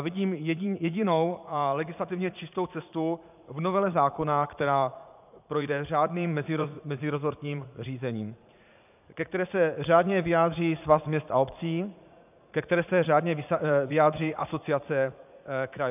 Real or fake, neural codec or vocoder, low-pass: fake; vocoder, 44.1 kHz, 80 mel bands, Vocos; 3.6 kHz